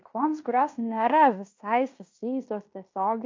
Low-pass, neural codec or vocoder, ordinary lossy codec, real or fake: 7.2 kHz; codec, 16 kHz in and 24 kHz out, 0.9 kbps, LongCat-Audio-Codec, fine tuned four codebook decoder; MP3, 48 kbps; fake